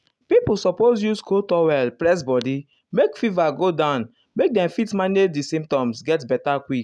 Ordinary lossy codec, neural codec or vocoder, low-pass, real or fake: none; none; none; real